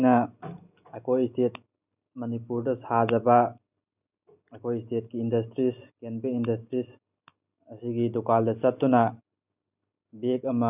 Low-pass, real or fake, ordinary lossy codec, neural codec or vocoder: 3.6 kHz; real; none; none